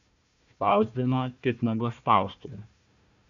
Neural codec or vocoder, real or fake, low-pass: codec, 16 kHz, 1 kbps, FunCodec, trained on Chinese and English, 50 frames a second; fake; 7.2 kHz